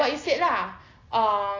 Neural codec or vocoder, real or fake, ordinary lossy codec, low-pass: none; real; AAC, 32 kbps; 7.2 kHz